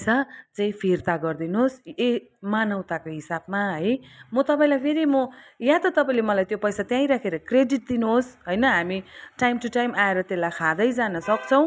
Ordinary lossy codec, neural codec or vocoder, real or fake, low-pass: none; none; real; none